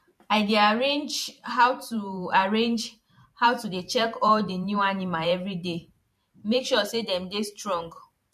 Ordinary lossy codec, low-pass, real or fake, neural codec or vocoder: MP3, 64 kbps; 14.4 kHz; fake; vocoder, 44.1 kHz, 128 mel bands every 512 samples, BigVGAN v2